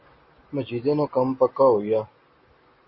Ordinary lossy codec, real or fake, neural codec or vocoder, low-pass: MP3, 24 kbps; real; none; 7.2 kHz